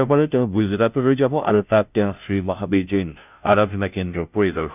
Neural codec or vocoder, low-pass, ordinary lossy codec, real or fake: codec, 16 kHz, 0.5 kbps, FunCodec, trained on Chinese and English, 25 frames a second; 3.6 kHz; none; fake